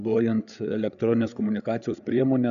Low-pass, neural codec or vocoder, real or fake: 7.2 kHz; codec, 16 kHz, 8 kbps, FreqCodec, larger model; fake